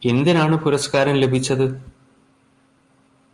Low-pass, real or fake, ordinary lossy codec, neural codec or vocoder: 10.8 kHz; real; Opus, 32 kbps; none